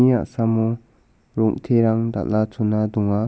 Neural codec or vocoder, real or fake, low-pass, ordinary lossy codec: none; real; none; none